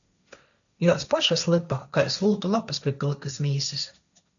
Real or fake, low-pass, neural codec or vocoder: fake; 7.2 kHz; codec, 16 kHz, 1.1 kbps, Voila-Tokenizer